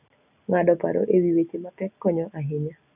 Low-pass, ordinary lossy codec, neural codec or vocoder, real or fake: 3.6 kHz; none; none; real